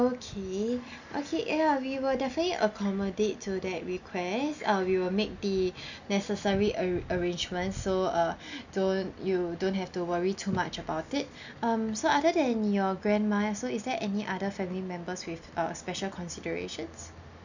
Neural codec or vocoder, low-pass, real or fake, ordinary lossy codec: none; 7.2 kHz; real; none